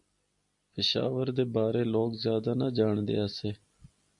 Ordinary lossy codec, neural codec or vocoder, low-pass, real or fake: MP3, 96 kbps; none; 10.8 kHz; real